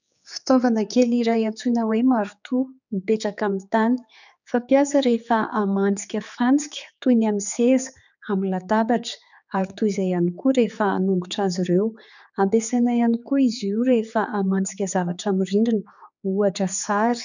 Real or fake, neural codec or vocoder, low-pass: fake; codec, 16 kHz, 4 kbps, X-Codec, HuBERT features, trained on general audio; 7.2 kHz